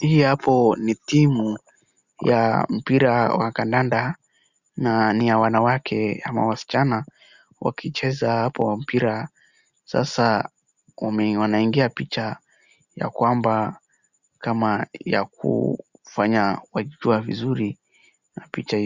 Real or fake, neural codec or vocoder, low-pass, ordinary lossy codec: real; none; 7.2 kHz; Opus, 64 kbps